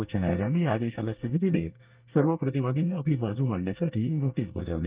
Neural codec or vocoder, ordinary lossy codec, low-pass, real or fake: codec, 24 kHz, 1 kbps, SNAC; Opus, 32 kbps; 3.6 kHz; fake